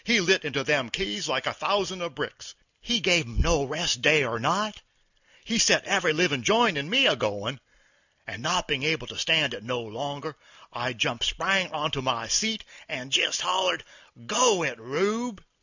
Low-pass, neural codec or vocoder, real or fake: 7.2 kHz; none; real